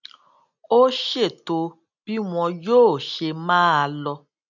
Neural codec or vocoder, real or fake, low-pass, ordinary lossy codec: none; real; 7.2 kHz; none